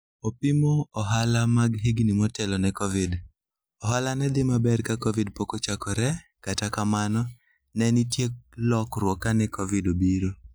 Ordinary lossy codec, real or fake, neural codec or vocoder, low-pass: none; real; none; none